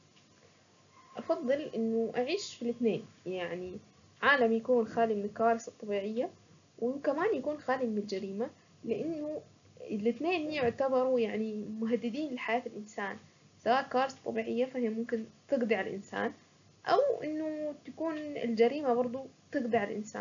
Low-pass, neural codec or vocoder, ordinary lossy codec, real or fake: 7.2 kHz; none; none; real